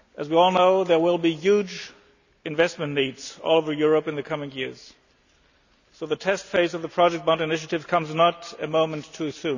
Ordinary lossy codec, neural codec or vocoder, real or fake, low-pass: none; none; real; 7.2 kHz